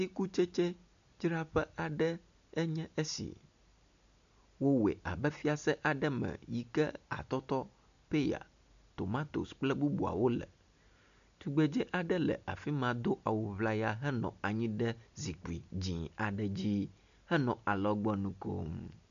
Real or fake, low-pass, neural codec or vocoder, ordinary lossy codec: real; 7.2 kHz; none; MP3, 96 kbps